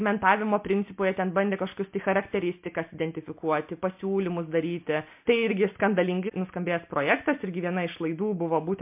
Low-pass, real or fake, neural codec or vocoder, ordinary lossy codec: 3.6 kHz; real; none; MP3, 32 kbps